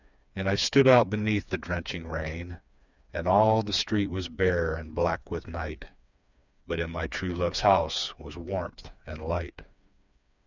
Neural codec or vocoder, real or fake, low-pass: codec, 16 kHz, 4 kbps, FreqCodec, smaller model; fake; 7.2 kHz